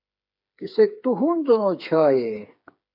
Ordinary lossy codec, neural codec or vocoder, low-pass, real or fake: AAC, 48 kbps; codec, 16 kHz, 4 kbps, FreqCodec, smaller model; 5.4 kHz; fake